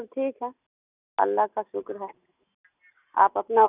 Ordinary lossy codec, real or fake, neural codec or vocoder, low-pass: none; real; none; 3.6 kHz